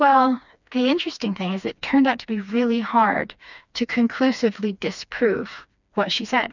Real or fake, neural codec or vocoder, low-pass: fake; codec, 16 kHz, 2 kbps, FreqCodec, smaller model; 7.2 kHz